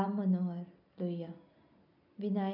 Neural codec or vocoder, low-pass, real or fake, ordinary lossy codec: none; 5.4 kHz; real; none